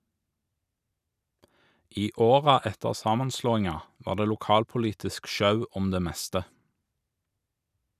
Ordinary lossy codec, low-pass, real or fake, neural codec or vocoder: none; 14.4 kHz; real; none